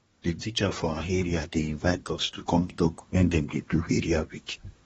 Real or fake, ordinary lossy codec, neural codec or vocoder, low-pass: fake; AAC, 24 kbps; codec, 24 kHz, 1 kbps, SNAC; 10.8 kHz